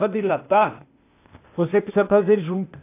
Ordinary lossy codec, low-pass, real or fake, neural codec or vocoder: AAC, 24 kbps; 3.6 kHz; fake; codec, 16 kHz, 0.8 kbps, ZipCodec